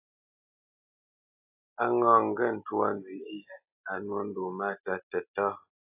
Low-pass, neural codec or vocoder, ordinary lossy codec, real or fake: 3.6 kHz; none; AAC, 24 kbps; real